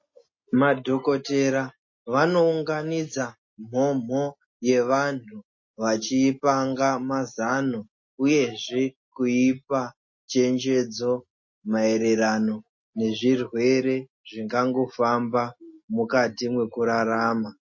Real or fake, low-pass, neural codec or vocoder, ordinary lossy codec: real; 7.2 kHz; none; MP3, 32 kbps